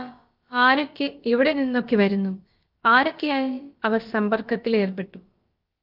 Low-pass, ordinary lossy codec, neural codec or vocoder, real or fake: 5.4 kHz; Opus, 24 kbps; codec, 16 kHz, about 1 kbps, DyCAST, with the encoder's durations; fake